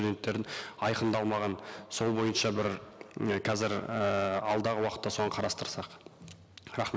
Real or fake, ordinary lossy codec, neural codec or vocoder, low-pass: real; none; none; none